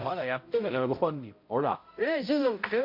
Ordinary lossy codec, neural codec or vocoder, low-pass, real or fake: MP3, 32 kbps; codec, 16 kHz, 0.5 kbps, X-Codec, HuBERT features, trained on balanced general audio; 5.4 kHz; fake